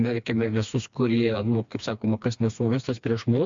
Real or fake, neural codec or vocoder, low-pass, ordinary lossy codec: fake; codec, 16 kHz, 2 kbps, FreqCodec, smaller model; 7.2 kHz; MP3, 64 kbps